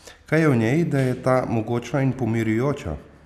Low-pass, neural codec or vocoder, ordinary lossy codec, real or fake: 14.4 kHz; none; none; real